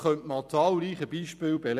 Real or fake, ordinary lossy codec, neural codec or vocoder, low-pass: fake; none; vocoder, 44.1 kHz, 128 mel bands every 256 samples, BigVGAN v2; 14.4 kHz